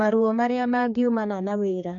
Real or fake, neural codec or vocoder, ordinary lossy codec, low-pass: fake; codec, 16 kHz, 2 kbps, X-Codec, HuBERT features, trained on general audio; none; 7.2 kHz